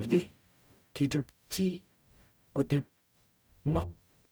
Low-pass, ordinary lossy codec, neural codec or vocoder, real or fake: none; none; codec, 44.1 kHz, 0.9 kbps, DAC; fake